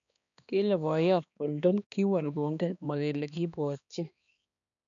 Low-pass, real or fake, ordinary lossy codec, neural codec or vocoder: 7.2 kHz; fake; none; codec, 16 kHz, 2 kbps, X-Codec, HuBERT features, trained on balanced general audio